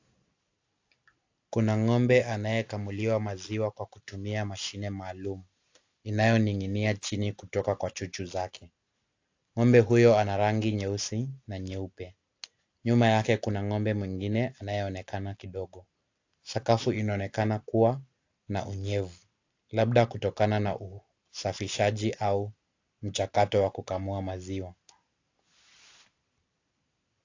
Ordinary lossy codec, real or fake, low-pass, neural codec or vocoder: AAC, 48 kbps; real; 7.2 kHz; none